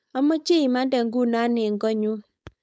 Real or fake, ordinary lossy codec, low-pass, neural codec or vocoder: fake; none; none; codec, 16 kHz, 4.8 kbps, FACodec